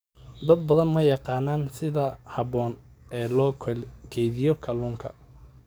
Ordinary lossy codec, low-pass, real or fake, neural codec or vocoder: none; none; fake; codec, 44.1 kHz, 7.8 kbps, DAC